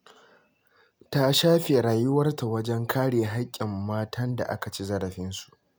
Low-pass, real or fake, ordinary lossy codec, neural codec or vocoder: none; real; none; none